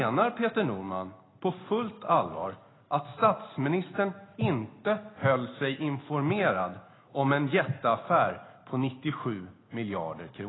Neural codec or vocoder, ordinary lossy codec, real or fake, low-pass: none; AAC, 16 kbps; real; 7.2 kHz